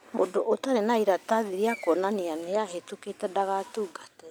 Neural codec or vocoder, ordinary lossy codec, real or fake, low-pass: none; none; real; none